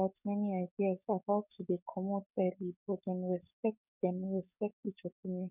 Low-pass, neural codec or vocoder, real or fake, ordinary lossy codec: 3.6 kHz; codec, 44.1 kHz, 7.8 kbps, DAC; fake; none